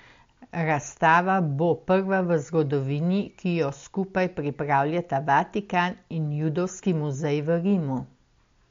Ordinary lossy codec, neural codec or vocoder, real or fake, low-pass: MP3, 48 kbps; none; real; 7.2 kHz